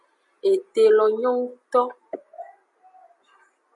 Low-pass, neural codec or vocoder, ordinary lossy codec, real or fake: 10.8 kHz; none; MP3, 48 kbps; real